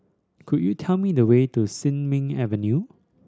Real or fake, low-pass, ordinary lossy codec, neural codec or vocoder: real; none; none; none